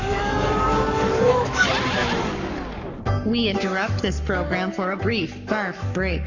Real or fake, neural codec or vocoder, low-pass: fake; codec, 44.1 kHz, 7.8 kbps, Pupu-Codec; 7.2 kHz